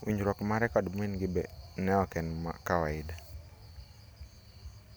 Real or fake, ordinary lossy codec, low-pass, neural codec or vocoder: real; none; none; none